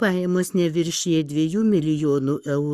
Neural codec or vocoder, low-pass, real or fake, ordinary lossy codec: codec, 44.1 kHz, 7.8 kbps, Pupu-Codec; 14.4 kHz; fake; Opus, 64 kbps